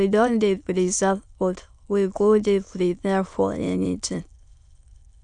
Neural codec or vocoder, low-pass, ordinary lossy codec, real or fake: autoencoder, 22.05 kHz, a latent of 192 numbers a frame, VITS, trained on many speakers; 9.9 kHz; none; fake